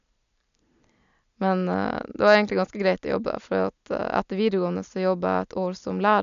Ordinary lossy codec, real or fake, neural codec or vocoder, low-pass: none; real; none; 7.2 kHz